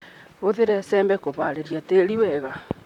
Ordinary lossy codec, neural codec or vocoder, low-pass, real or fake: none; vocoder, 44.1 kHz, 128 mel bands, Pupu-Vocoder; 19.8 kHz; fake